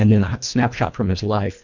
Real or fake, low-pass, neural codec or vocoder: fake; 7.2 kHz; codec, 24 kHz, 1.5 kbps, HILCodec